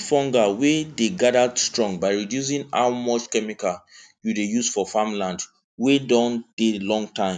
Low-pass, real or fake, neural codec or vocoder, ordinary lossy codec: 9.9 kHz; real; none; none